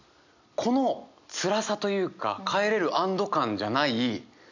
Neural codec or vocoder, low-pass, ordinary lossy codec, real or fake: none; 7.2 kHz; none; real